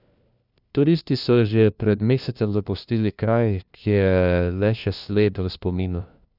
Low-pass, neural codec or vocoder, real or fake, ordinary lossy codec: 5.4 kHz; codec, 16 kHz, 1 kbps, FunCodec, trained on LibriTTS, 50 frames a second; fake; none